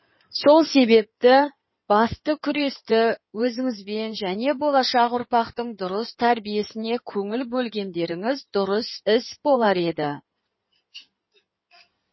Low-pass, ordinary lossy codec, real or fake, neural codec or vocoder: 7.2 kHz; MP3, 24 kbps; fake; codec, 16 kHz in and 24 kHz out, 2.2 kbps, FireRedTTS-2 codec